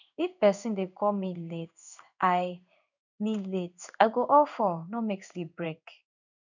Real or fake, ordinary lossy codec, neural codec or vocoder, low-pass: fake; none; codec, 16 kHz in and 24 kHz out, 1 kbps, XY-Tokenizer; 7.2 kHz